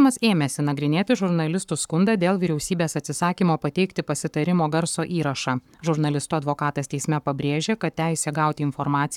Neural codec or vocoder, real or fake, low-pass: codec, 44.1 kHz, 7.8 kbps, Pupu-Codec; fake; 19.8 kHz